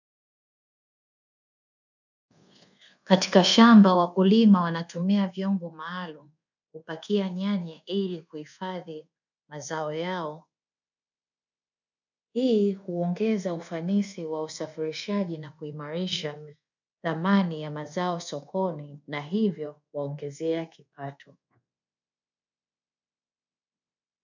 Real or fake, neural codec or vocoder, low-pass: fake; codec, 24 kHz, 1.2 kbps, DualCodec; 7.2 kHz